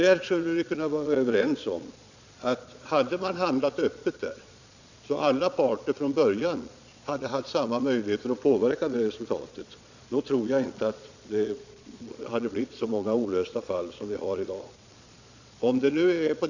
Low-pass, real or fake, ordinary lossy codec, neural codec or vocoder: 7.2 kHz; fake; none; vocoder, 22.05 kHz, 80 mel bands, Vocos